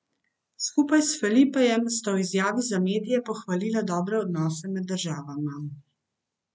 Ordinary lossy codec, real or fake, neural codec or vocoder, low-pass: none; real; none; none